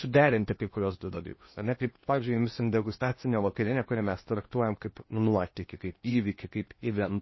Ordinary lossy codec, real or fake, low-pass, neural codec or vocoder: MP3, 24 kbps; fake; 7.2 kHz; codec, 16 kHz in and 24 kHz out, 0.8 kbps, FocalCodec, streaming, 65536 codes